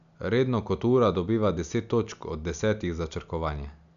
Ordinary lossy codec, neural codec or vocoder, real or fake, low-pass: MP3, 96 kbps; none; real; 7.2 kHz